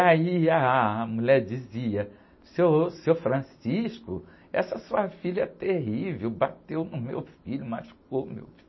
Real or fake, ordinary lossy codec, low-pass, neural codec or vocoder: real; MP3, 24 kbps; 7.2 kHz; none